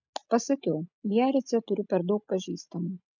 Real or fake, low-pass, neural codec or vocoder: real; 7.2 kHz; none